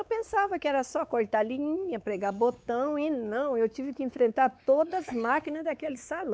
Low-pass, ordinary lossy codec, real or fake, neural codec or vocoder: none; none; fake; codec, 16 kHz, 4 kbps, X-Codec, WavLM features, trained on Multilingual LibriSpeech